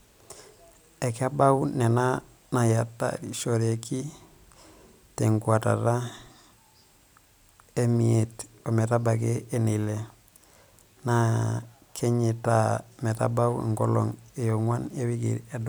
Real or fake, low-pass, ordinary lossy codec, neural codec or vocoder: fake; none; none; vocoder, 44.1 kHz, 128 mel bands every 256 samples, BigVGAN v2